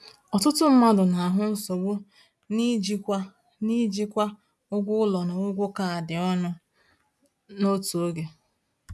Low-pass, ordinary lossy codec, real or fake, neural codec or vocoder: none; none; real; none